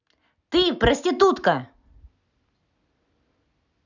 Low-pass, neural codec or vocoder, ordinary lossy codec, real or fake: 7.2 kHz; none; none; real